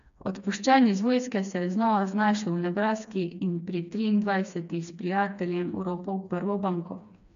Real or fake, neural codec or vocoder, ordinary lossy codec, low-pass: fake; codec, 16 kHz, 2 kbps, FreqCodec, smaller model; MP3, 96 kbps; 7.2 kHz